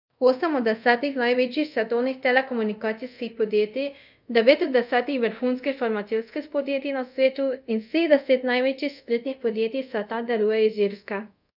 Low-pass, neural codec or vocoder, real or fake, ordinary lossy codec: 5.4 kHz; codec, 24 kHz, 0.5 kbps, DualCodec; fake; none